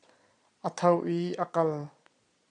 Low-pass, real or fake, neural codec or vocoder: 9.9 kHz; fake; vocoder, 22.05 kHz, 80 mel bands, Vocos